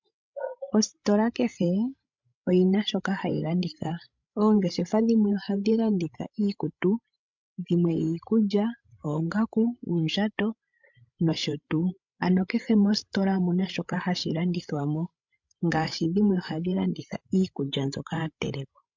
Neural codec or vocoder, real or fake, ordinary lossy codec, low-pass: codec, 16 kHz, 16 kbps, FreqCodec, larger model; fake; MP3, 64 kbps; 7.2 kHz